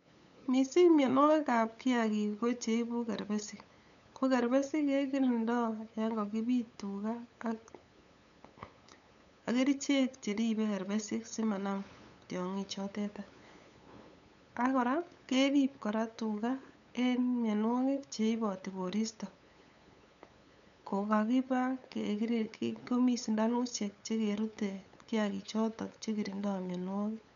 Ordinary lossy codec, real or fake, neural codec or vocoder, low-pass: none; fake; codec, 16 kHz, 8 kbps, FunCodec, trained on LibriTTS, 25 frames a second; 7.2 kHz